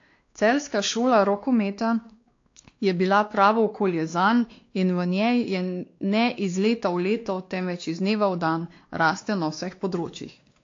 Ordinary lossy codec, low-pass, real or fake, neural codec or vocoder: AAC, 48 kbps; 7.2 kHz; fake; codec, 16 kHz, 2 kbps, X-Codec, WavLM features, trained on Multilingual LibriSpeech